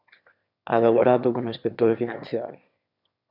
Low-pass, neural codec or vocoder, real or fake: 5.4 kHz; autoencoder, 22.05 kHz, a latent of 192 numbers a frame, VITS, trained on one speaker; fake